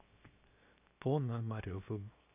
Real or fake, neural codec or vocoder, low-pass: fake; codec, 16 kHz, 0.7 kbps, FocalCodec; 3.6 kHz